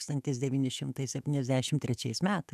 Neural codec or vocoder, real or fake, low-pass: autoencoder, 48 kHz, 128 numbers a frame, DAC-VAE, trained on Japanese speech; fake; 14.4 kHz